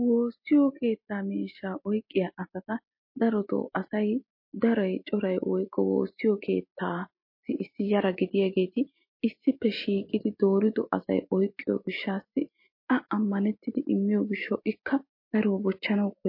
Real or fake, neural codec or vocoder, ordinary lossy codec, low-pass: real; none; MP3, 32 kbps; 5.4 kHz